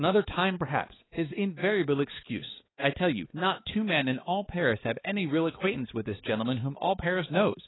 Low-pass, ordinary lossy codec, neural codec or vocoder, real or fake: 7.2 kHz; AAC, 16 kbps; codec, 16 kHz, 4 kbps, X-Codec, HuBERT features, trained on balanced general audio; fake